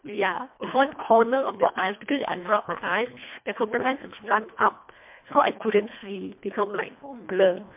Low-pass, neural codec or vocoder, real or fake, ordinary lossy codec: 3.6 kHz; codec, 24 kHz, 1.5 kbps, HILCodec; fake; MP3, 32 kbps